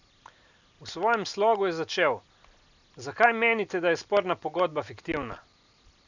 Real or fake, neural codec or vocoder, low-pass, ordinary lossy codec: real; none; 7.2 kHz; none